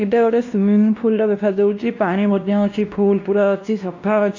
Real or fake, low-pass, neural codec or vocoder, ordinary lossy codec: fake; 7.2 kHz; codec, 16 kHz, 1 kbps, X-Codec, WavLM features, trained on Multilingual LibriSpeech; AAC, 48 kbps